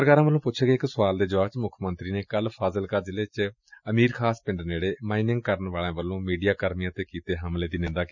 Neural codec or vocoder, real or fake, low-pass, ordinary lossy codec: none; real; 7.2 kHz; none